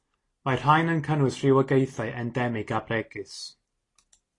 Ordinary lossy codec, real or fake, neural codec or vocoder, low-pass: AAC, 32 kbps; real; none; 10.8 kHz